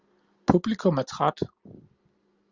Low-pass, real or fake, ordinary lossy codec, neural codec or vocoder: 7.2 kHz; real; Opus, 32 kbps; none